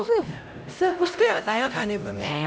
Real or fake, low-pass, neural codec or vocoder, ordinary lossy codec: fake; none; codec, 16 kHz, 0.5 kbps, X-Codec, HuBERT features, trained on LibriSpeech; none